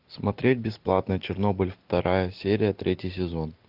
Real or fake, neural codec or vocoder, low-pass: real; none; 5.4 kHz